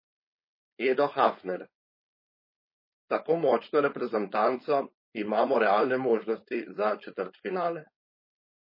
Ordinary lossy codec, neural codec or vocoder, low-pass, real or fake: MP3, 24 kbps; codec, 16 kHz, 4.8 kbps, FACodec; 5.4 kHz; fake